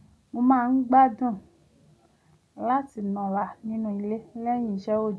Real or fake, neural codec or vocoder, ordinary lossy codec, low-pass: real; none; none; none